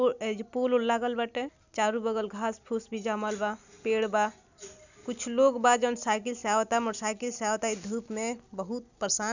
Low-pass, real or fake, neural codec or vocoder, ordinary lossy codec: 7.2 kHz; real; none; none